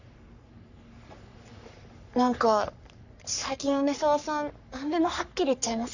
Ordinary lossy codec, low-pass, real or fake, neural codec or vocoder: none; 7.2 kHz; fake; codec, 44.1 kHz, 3.4 kbps, Pupu-Codec